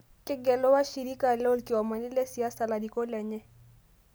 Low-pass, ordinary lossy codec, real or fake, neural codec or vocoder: none; none; real; none